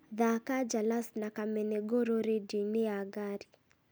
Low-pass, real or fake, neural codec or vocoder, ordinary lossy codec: none; real; none; none